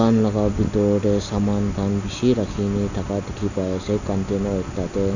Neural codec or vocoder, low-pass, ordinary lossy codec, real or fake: none; 7.2 kHz; none; real